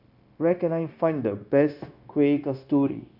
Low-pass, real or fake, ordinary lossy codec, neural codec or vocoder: 5.4 kHz; fake; none; codec, 16 kHz, 0.9 kbps, LongCat-Audio-Codec